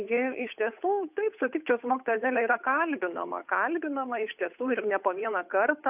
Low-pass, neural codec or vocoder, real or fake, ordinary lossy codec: 3.6 kHz; codec, 16 kHz, 8 kbps, FunCodec, trained on Chinese and English, 25 frames a second; fake; AAC, 32 kbps